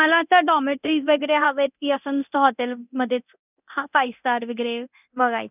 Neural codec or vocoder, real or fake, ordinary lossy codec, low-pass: codec, 24 kHz, 0.9 kbps, DualCodec; fake; none; 3.6 kHz